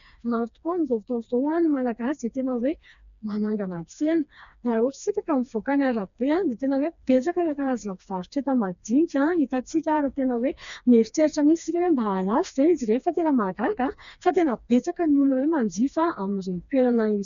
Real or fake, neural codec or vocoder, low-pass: fake; codec, 16 kHz, 2 kbps, FreqCodec, smaller model; 7.2 kHz